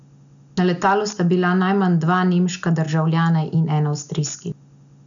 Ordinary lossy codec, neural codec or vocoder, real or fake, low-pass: none; none; real; 7.2 kHz